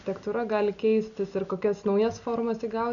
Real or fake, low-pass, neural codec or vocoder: real; 7.2 kHz; none